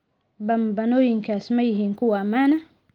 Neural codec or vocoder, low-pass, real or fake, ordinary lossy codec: none; 19.8 kHz; real; Opus, 24 kbps